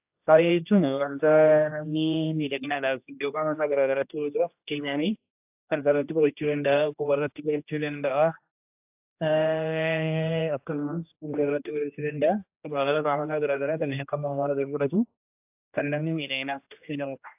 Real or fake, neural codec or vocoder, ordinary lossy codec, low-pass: fake; codec, 16 kHz, 1 kbps, X-Codec, HuBERT features, trained on general audio; AAC, 32 kbps; 3.6 kHz